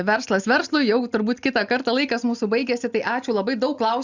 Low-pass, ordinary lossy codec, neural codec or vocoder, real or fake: 7.2 kHz; Opus, 64 kbps; none; real